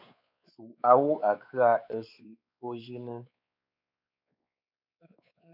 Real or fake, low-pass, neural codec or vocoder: fake; 5.4 kHz; codec, 16 kHz, 4 kbps, X-Codec, WavLM features, trained on Multilingual LibriSpeech